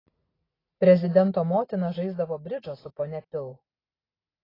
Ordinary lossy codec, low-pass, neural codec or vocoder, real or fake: AAC, 24 kbps; 5.4 kHz; none; real